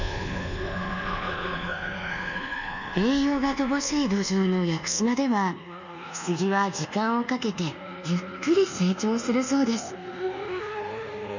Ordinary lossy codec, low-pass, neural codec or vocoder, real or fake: none; 7.2 kHz; codec, 24 kHz, 1.2 kbps, DualCodec; fake